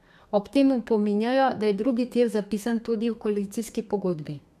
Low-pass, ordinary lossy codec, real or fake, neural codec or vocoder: 14.4 kHz; MP3, 96 kbps; fake; codec, 32 kHz, 1.9 kbps, SNAC